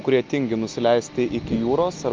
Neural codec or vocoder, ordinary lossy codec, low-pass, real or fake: none; Opus, 32 kbps; 7.2 kHz; real